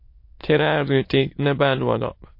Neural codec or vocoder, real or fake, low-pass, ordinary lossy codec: autoencoder, 22.05 kHz, a latent of 192 numbers a frame, VITS, trained on many speakers; fake; 5.4 kHz; MP3, 32 kbps